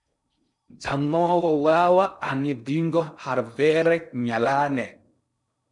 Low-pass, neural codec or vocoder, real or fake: 10.8 kHz; codec, 16 kHz in and 24 kHz out, 0.6 kbps, FocalCodec, streaming, 4096 codes; fake